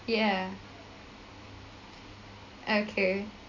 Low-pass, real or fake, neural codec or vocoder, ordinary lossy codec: 7.2 kHz; real; none; MP3, 48 kbps